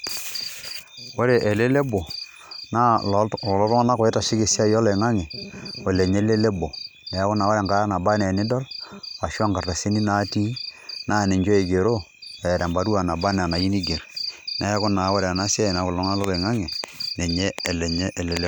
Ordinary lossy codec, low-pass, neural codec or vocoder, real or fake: none; none; none; real